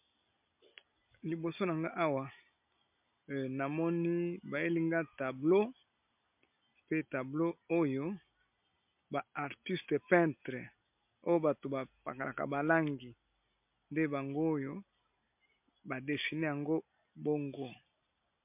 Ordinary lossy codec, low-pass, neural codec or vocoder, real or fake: MP3, 32 kbps; 3.6 kHz; none; real